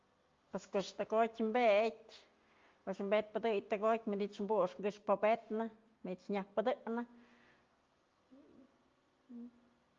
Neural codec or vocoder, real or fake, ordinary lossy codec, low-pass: none; real; Opus, 32 kbps; 7.2 kHz